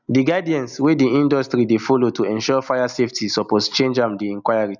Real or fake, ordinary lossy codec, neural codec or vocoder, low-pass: real; none; none; 7.2 kHz